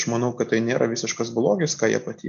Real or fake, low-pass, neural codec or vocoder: real; 7.2 kHz; none